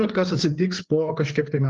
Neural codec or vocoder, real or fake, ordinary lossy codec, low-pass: codec, 16 kHz, 4 kbps, FreqCodec, larger model; fake; Opus, 24 kbps; 7.2 kHz